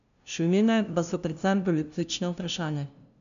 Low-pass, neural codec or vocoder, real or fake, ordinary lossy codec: 7.2 kHz; codec, 16 kHz, 0.5 kbps, FunCodec, trained on LibriTTS, 25 frames a second; fake; none